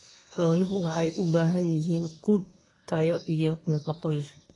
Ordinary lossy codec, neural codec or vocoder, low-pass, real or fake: AAC, 32 kbps; codec, 24 kHz, 1 kbps, SNAC; 10.8 kHz; fake